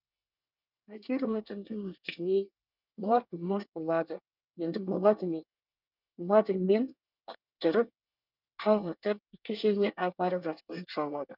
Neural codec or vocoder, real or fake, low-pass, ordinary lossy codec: codec, 24 kHz, 1 kbps, SNAC; fake; 5.4 kHz; none